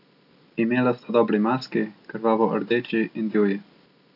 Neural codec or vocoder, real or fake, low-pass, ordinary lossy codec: none; real; 5.4 kHz; none